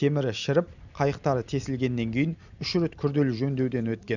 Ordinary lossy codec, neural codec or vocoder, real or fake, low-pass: none; none; real; 7.2 kHz